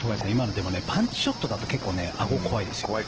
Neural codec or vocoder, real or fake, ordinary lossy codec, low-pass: none; real; Opus, 16 kbps; 7.2 kHz